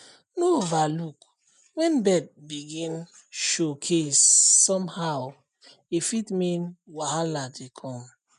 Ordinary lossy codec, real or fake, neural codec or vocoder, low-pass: none; real; none; 10.8 kHz